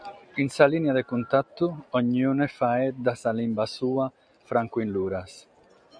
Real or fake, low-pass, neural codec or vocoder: real; 9.9 kHz; none